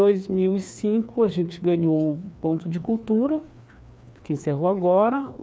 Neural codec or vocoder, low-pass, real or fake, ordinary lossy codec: codec, 16 kHz, 2 kbps, FreqCodec, larger model; none; fake; none